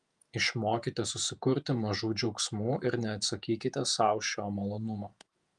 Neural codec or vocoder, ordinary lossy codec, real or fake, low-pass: vocoder, 48 kHz, 128 mel bands, Vocos; Opus, 32 kbps; fake; 10.8 kHz